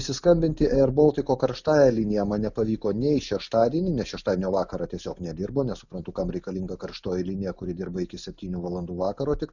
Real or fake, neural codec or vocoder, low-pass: real; none; 7.2 kHz